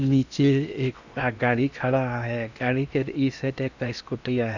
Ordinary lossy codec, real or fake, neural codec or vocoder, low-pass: none; fake; codec, 16 kHz in and 24 kHz out, 0.8 kbps, FocalCodec, streaming, 65536 codes; 7.2 kHz